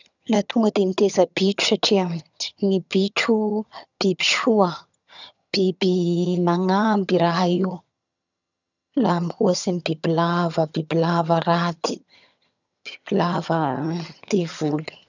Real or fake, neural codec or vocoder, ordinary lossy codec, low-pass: fake; vocoder, 22.05 kHz, 80 mel bands, HiFi-GAN; none; 7.2 kHz